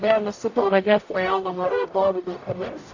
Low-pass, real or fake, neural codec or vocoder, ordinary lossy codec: 7.2 kHz; fake; codec, 44.1 kHz, 0.9 kbps, DAC; AAC, 48 kbps